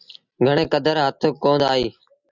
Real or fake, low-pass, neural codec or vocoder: real; 7.2 kHz; none